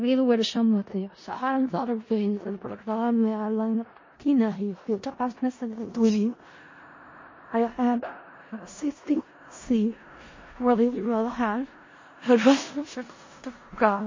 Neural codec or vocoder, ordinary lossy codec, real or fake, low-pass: codec, 16 kHz in and 24 kHz out, 0.4 kbps, LongCat-Audio-Codec, four codebook decoder; MP3, 32 kbps; fake; 7.2 kHz